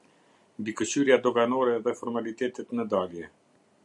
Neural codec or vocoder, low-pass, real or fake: none; 10.8 kHz; real